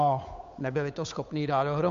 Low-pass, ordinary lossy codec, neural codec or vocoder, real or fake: 7.2 kHz; MP3, 64 kbps; codec, 16 kHz, 8 kbps, FunCodec, trained on Chinese and English, 25 frames a second; fake